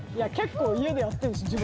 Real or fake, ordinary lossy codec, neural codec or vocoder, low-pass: real; none; none; none